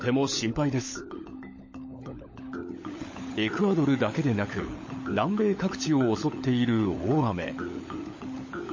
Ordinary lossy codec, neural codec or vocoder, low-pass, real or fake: MP3, 32 kbps; codec, 16 kHz, 16 kbps, FunCodec, trained on LibriTTS, 50 frames a second; 7.2 kHz; fake